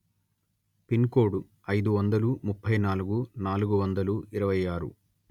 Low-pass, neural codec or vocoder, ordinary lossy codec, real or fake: 19.8 kHz; none; none; real